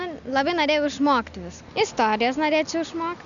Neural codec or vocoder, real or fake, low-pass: none; real; 7.2 kHz